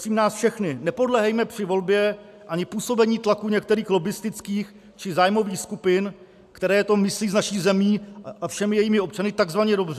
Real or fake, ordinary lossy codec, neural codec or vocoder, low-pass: real; AAC, 96 kbps; none; 14.4 kHz